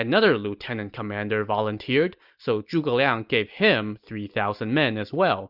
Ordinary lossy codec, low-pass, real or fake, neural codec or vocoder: Opus, 64 kbps; 5.4 kHz; real; none